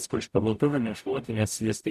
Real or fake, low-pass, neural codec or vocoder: fake; 14.4 kHz; codec, 44.1 kHz, 0.9 kbps, DAC